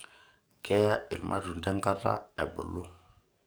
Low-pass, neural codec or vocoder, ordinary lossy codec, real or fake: none; codec, 44.1 kHz, 7.8 kbps, DAC; none; fake